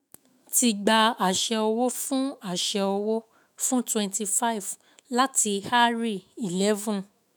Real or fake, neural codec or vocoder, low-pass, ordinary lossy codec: fake; autoencoder, 48 kHz, 128 numbers a frame, DAC-VAE, trained on Japanese speech; none; none